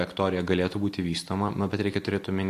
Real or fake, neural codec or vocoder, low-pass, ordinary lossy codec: real; none; 14.4 kHz; AAC, 64 kbps